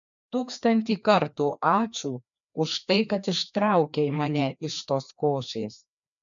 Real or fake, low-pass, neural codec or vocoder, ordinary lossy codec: fake; 7.2 kHz; codec, 16 kHz, 2 kbps, FreqCodec, larger model; MP3, 96 kbps